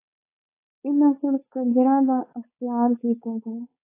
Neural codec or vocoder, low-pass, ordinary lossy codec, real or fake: codec, 16 kHz, 4.8 kbps, FACodec; 3.6 kHz; AAC, 24 kbps; fake